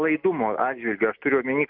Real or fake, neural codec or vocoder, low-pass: real; none; 5.4 kHz